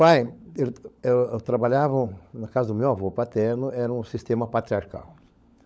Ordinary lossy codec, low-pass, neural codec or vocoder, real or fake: none; none; codec, 16 kHz, 16 kbps, FunCodec, trained on LibriTTS, 50 frames a second; fake